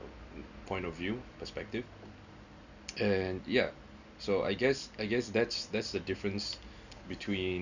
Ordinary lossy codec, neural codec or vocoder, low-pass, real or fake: none; none; 7.2 kHz; real